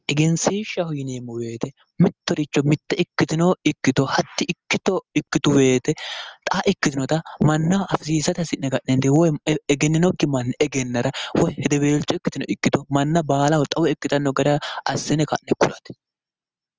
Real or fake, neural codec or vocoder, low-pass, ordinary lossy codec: real; none; 7.2 kHz; Opus, 24 kbps